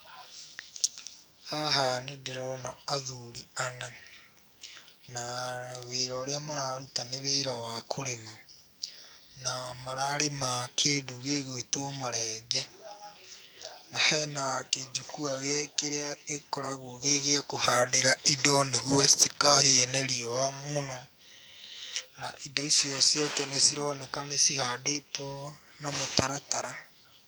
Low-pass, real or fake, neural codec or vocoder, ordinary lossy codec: none; fake; codec, 44.1 kHz, 2.6 kbps, SNAC; none